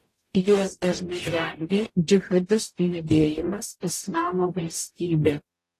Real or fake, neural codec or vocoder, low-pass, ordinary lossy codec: fake; codec, 44.1 kHz, 0.9 kbps, DAC; 14.4 kHz; AAC, 48 kbps